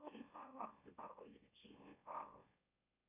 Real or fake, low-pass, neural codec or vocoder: fake; 3.6 kHz; autoencoder, 44.1 kHz, a latent of 192 numbers a frame, MeloTTS